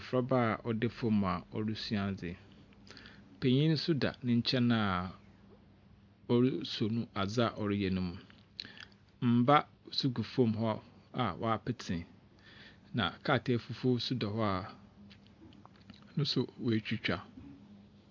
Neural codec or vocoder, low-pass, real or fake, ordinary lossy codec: none; 7.2 kHz; real; MP3, 64 kbps